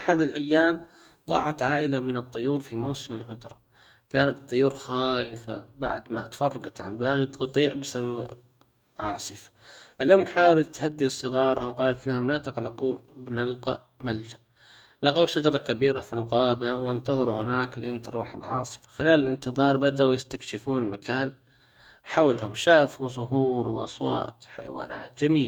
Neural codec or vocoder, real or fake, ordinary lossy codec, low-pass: codec, 44.1 kHz, 2.6 kbps, DAC; fake; none; 19.8 kHz